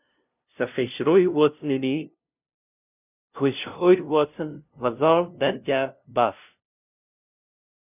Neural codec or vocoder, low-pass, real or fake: codec, 16 kHz, 0.5 kbps, FunCodec, trained on LibriTTS, 25 frames a second; 3.6 kHz; fake